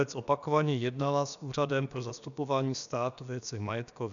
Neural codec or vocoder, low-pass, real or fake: codec, 16 kHz, about 1 kbps, DyCAST, with the encoder's durations; 7.2 kHz; fake